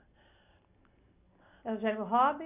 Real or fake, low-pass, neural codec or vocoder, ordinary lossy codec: real; 3.6 kHz; none; none